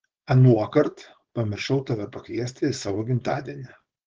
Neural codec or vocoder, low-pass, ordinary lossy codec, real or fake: codec, 16 kHz, 4.8 kbps, FACodec; 7.2 kHz; Opus, 16 kbps; fake